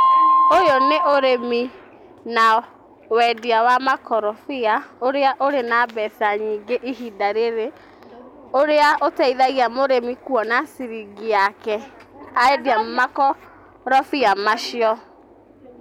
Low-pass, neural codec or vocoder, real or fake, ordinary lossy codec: 19.8 kHz; none; real; none